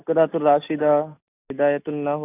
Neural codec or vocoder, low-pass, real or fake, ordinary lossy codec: none; 3.6 kHz; real; AAC, 24 kbps